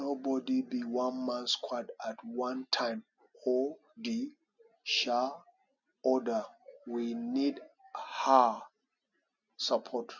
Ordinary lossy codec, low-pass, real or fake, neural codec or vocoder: none; 7.2 kHz; real; none